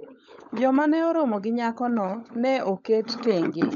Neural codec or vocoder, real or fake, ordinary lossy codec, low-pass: codec, 16 kHz, 16 kbps, FunCodec, trained on LibriTTS, 50 frames a second; fake; none; 7.2 kHz